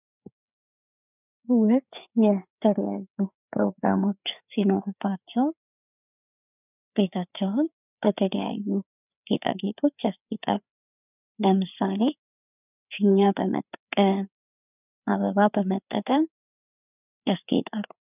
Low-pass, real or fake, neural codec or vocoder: 3.6 kHz; fake; codec, 16 kHz, 4 kbps, FreqCodec, larger model